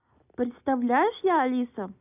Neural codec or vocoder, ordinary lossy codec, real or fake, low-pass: none; none; real; 3.6 kHz